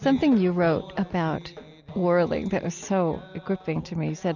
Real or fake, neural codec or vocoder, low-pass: real; none; 7.2 kHz